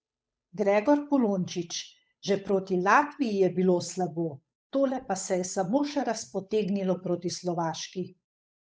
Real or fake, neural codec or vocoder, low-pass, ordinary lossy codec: fake; codec, 16 kHz, 8 kbps, FunCodec, trained on Chinese and English, 25 frames a second; none; none